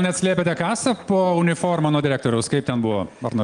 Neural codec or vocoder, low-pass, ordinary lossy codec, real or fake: none; 9.9 kHz; Opus, 32 kbps; real